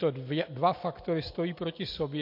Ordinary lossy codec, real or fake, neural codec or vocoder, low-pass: MP3, 32 kbps; real; none; 5.4 kHz